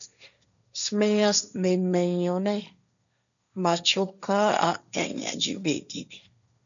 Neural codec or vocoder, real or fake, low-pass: codec, 16 kHz, 1.1 kbps, Voila-Tokenizer; fake; 7.2 kHz